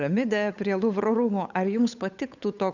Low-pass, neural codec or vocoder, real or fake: 7.2 kHz; codec, 16 kHz, 8 kbps, FunCodec, trained on Chinese and English, 25 frames a second; fake